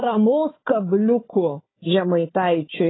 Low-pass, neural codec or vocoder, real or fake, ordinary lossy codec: 7.2 kHz; codec, 16 kHz, 4 kbps, FunCodec, trained on Chinese and English, 50 frames a second; fake; AAC, 16 kbps